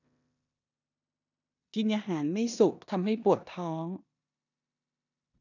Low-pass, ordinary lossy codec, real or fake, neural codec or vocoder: 7.2 kHz; none; fake; codec, 16 kHz in and 24 kHz out, 0.9 kbps, LongCat-Audio-Codec, four codebook decoder